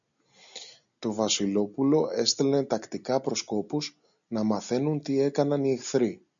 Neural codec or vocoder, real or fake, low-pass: none; real; 7.2 kHz